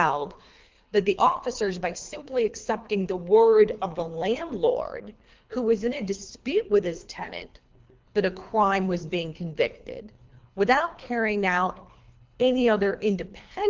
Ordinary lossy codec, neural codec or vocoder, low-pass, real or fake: Opus, 24 kbps; codec, 24 kHz, 3 kbps, HILCodec; 7.2 kHz; fake